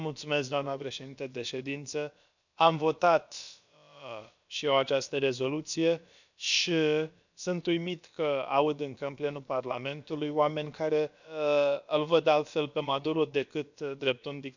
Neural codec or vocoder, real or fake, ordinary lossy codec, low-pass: codec, 16 kHz, about 1 kbps, DyCAST, with the encoder's durations; fake; none; 7.2 kHz